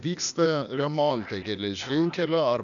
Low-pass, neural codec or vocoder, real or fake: 7.2 kHz; codec, 16 kHz, 0.8 kbps, ZipCodec; fake